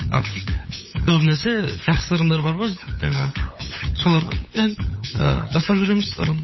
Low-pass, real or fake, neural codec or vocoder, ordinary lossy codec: 7.2 kHz; fake; codec, 16 kHz, 8 kbps, FunCodec, trained on LibriTTS, 25 frames a second; MP3, 24 kbps